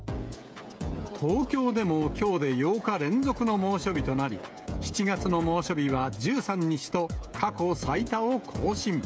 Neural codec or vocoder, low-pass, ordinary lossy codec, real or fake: codec, 16 kHz, 16 kbps, FreqCodec, smaller model; none; none; fake